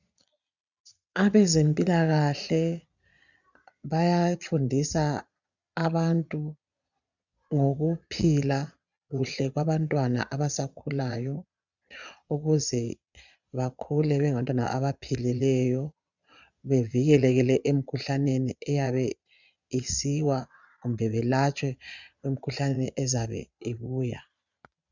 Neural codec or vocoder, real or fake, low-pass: vocoder, 22.05 kHz, 80 mel bands, Vocos; fake; 7.2 kHz